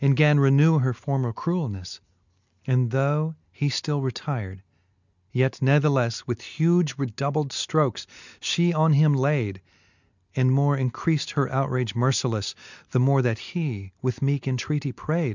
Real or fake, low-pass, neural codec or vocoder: real; 7.2 kHz; none